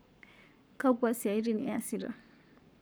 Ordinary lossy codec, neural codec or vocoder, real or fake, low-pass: none; codec, 44.1 kHz, 7.8 kbps, Pupu-Codec; fake; none